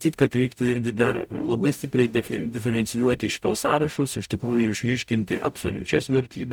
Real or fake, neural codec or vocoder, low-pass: fake; codec, 44.1 kHz, 0.9 kbps, DAC; 19.8 kHz